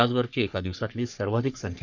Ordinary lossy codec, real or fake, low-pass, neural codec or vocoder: none; fake; 7.2 kHz; codec, 44.1 kHz, 3.4 kbps, Pupu-Codec